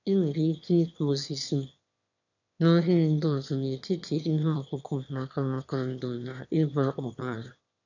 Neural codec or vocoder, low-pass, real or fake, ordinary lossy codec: autoencoder, 22.05 kHz, a latent of 192 numbers a frame, VITS, trained on one speaker; 7.2 kHz; fake; none